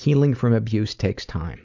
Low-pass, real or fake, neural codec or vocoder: 7.2 kHz; real; none